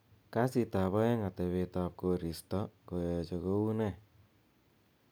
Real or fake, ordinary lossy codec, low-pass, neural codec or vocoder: real; none; none; none